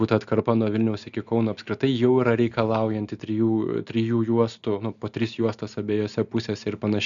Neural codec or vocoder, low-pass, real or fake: none; 7.2 kHz; real